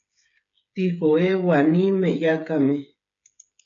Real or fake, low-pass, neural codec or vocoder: fake; 7.2 kHz; codec, 16 kHz, 8 kbps, FreqCodec, smaller model